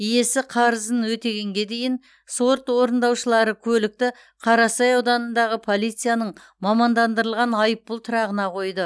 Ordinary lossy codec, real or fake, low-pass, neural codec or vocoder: none; real; none; none